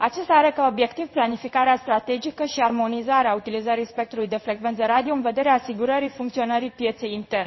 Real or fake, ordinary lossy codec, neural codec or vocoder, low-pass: fake; MP3, 24 kbps; codec, 16 kHz in and 24 kHz out, 1 kbps, XY-Tokenizer; 7.2 kHz